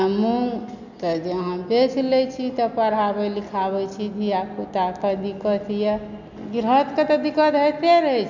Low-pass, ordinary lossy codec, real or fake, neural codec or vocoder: 7.2 kHz; none; real; none